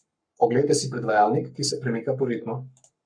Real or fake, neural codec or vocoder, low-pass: fake; codec, 44.1 kHz, 7.8 kbps, Pupu-Codec; 9.9 kHz